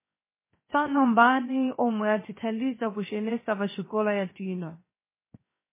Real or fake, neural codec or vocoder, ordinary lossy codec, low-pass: fake; codec, 16 kHz, 0.7 kbps, FocalCodec; MP3, 16 kbps; 3.6 kHz